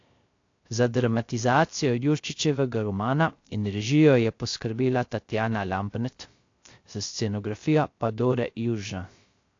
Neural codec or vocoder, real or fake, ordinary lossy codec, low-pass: codec, 16 kHz, 0.3 kbps, FocalCodec; fake; AAC, 48 kbps; 7.2 kHz